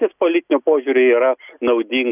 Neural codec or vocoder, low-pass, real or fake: none; 3.6 kHz; real